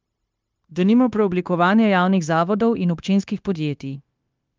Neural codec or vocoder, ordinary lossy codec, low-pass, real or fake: codec, 16 kHz, 0.9 kbps, LongCat-Audio-Codec; Opus, 24 kbps; 7.2 kHz; fake